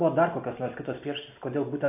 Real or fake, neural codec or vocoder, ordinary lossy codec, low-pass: real; none; AAC, 32 kbps; 3.6 kHz